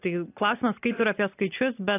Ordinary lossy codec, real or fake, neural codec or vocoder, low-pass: AAC, 16 kbps; real; none; 3.6 kHz